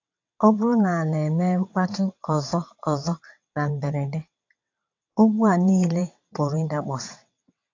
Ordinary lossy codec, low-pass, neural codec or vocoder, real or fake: AAC, 48 kbps; 7.2 kHz; vocoder, 22.05 kHz, 80 mel bands, WaveNeXt; fake